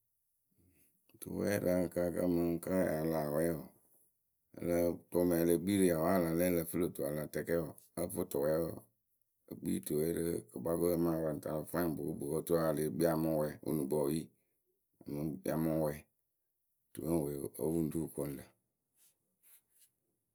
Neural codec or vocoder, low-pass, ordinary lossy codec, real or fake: none; none; none; real